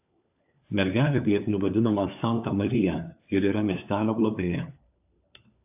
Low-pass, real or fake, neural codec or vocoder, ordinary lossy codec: 3.6 kHz; fake; codec, 16 kHz, 4 kbps, FunCodec, trained on LibriTTS, 50 frames a second; AAC, 32 kbps